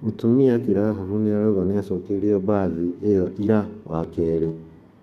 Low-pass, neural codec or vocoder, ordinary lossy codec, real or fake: 14.4 kHz; codec, 32 kHz, 1.9 kbps, SNAC; none; fake